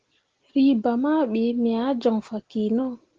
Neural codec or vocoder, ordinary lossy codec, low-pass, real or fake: none; Opus, 16 kbps; 7.2 kHz; real